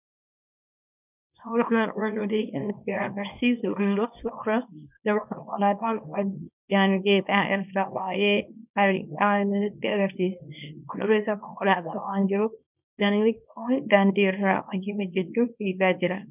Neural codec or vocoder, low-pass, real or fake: codec, 24 kHz, 0.9 kbps, WavTokenizer, small release; 3.6 kHz; fake